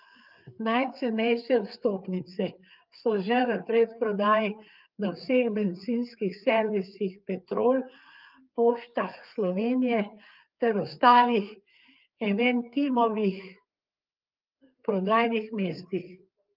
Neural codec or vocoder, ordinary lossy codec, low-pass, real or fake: codec, 16 kHz, 4 kbps, FreqCodec, larger model; Opus, 24 kbps; 5.4 kHz; fake